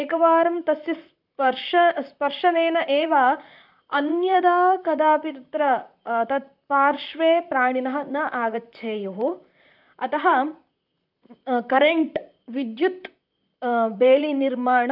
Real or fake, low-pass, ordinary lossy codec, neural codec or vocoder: fake; 5.4 kHz; none; vocoder, 44.1 kHz, 128 mel bands, Pupu-Vocoder